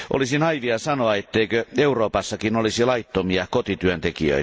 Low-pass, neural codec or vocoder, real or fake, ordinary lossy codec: none; none; real; none